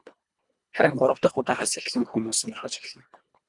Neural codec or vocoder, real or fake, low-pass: codec, 24 kHz, 1.5 kbps, HILCodec; fake; 10.8 kHz